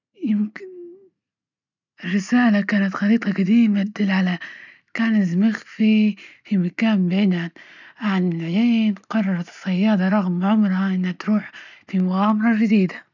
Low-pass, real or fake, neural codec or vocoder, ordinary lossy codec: 7.2 kHz; real; none; none